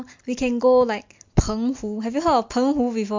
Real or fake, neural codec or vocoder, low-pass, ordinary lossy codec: real; none; 7.2 kHz; MP3, 48 kbps